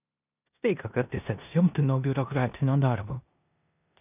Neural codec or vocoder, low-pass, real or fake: codec, 16 kHz in and 24 kHz out, 0.9 kbps, LongCat-Audio-Codec, four codebook decoder; 3.6 kHz; fake